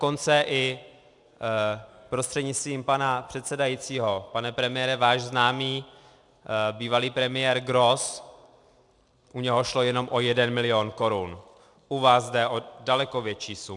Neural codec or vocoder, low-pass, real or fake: none; 10.8 kHz; real